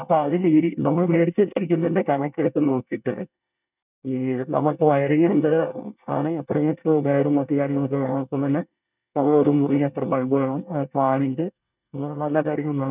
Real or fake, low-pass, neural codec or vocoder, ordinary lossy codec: fake; 3.6 kHz; codec, 24 kHz, 1 kbps, SNAC; none